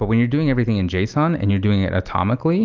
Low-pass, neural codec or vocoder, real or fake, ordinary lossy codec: 7.2 kHz; none; real; Opus, 32 kbps